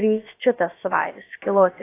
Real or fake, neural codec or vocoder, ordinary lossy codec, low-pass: fake; codec, 16 kHz, about 1 kbps, DyCAST, with the encoder's durations; AAC, 24 kbps; 3.6 kHz